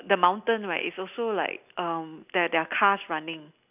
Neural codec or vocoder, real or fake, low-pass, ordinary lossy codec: none; real; 3.6 kHz; none